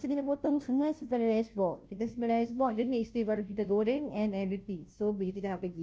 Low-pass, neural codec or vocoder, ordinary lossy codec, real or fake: none; codec, 16 kHz, 0.5 kbps, FunCodec, trained on Chinese and English, 25 frames a second; none; fake